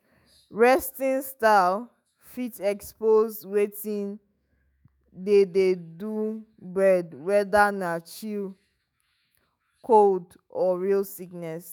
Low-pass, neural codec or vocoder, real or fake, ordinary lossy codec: none; autoencoder, 48 kHz, 128 numbers a frame, DAC-VAE, trained on Japanese speech; fake; none